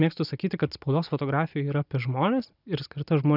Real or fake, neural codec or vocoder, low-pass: real; none; 5.4 kHz